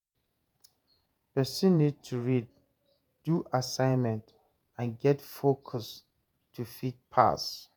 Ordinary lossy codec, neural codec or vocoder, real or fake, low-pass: none; vocoder, 48 kHz, 128 mel bands, Vocos; fake; none